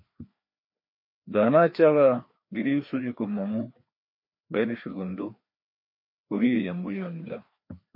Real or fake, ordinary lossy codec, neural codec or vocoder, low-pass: fake; MP3, 32 kbps; codec, 16 kHz, 2 kbps, FreqCodec, larger model; 5.4 kHz